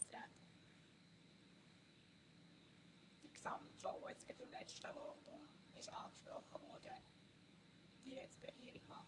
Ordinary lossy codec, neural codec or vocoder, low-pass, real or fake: AAC, 64 kbps; codec, 24 kHz, 0.9 kbps, WavTokenizer, medium speech release version 1; 10.8 kHz; fake